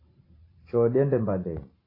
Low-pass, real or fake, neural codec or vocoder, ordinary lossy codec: 5.4 kHz; fake; vocoder, 44.1 kHz, 128 mel bands every 512 samples, BigVGAN v2; AAC, 24 kbps